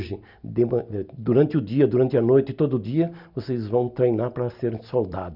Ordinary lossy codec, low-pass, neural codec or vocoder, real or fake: none; 5.4 kHz; none; real